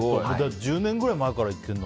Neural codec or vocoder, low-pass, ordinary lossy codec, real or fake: none; none; none; real